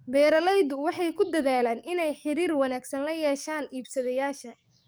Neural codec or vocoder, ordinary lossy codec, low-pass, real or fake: codec, 44.1 kHz, 7.8 kbps, DAC; none; none; fake